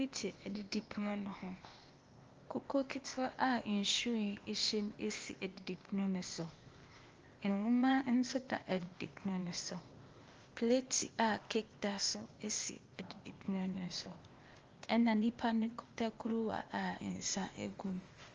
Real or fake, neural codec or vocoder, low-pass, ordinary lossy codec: fake; codec, 16 kHz, 0.8 kbps, ZipCodec; 7.2 kHz; Opus, 32 kbps